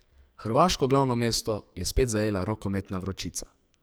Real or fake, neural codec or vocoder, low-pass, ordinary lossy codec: fake; codec, 44.1 kHz, 2.6 kbps, SNAC; none; none